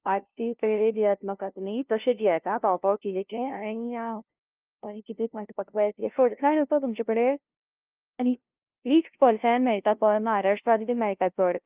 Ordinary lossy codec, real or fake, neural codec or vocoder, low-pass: Opus, 32 kbps; fake; codec, 16 kHz, 0.5 kbps, FunCodec, trained on LibriTTS, 25 frames a second; 3.6 kHz